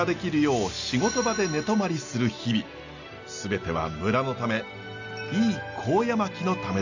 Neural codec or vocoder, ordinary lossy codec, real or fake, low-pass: none; none; real; 7.2 kHz